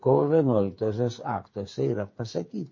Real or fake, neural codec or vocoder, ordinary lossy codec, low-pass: fake; codec, 16 kHz, 8 kbps, FreqCodec, smaller model; MP3, 32 kbps; 7.2 kHz